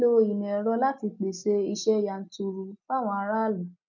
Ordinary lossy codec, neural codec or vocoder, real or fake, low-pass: none; none; real; 7.2 kHz